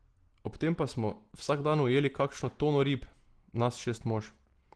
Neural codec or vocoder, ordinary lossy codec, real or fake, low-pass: none; Opus, 16 kbps; real; 10.8 kHz